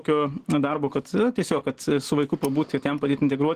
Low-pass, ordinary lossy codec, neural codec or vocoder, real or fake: 14.4 kHz; Opus, 16 kbps; none; real